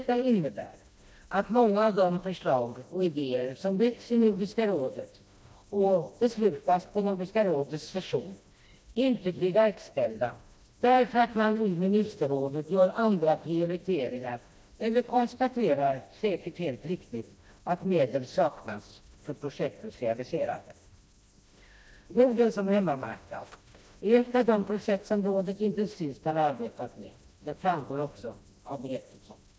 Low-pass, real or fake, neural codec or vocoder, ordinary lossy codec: none; fake; codec, 16 kHz, 1 kbps, FreqCodec, smaller model; none